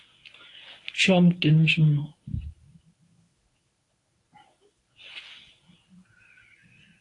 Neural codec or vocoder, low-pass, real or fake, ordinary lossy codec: codec, 24 kHz, 0.9 kbps, WavTokenizer, medium speech release version 1; 10.8 kHz; fake; AAC, 48 kbps